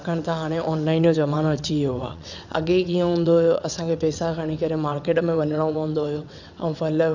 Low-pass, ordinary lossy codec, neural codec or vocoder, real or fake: 7.2 kHz; none; vocoder, 22.05 kHz, 80 mel bands, WaveNeXt; fake